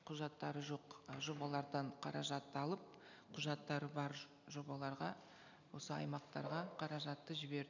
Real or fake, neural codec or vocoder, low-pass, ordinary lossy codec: real; none; 7.2 kHz; none